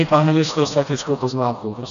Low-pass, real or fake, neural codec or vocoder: 7.2 kHz; fake; codec, 16 kHz, 1 kbps, FreqCodec, smaller model